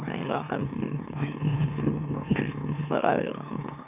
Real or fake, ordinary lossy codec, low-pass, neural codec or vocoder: fake; none; 3.6 kHz; autoencoder, 44.1 kHz, a latent of 192 numbers a frame, MeloTTS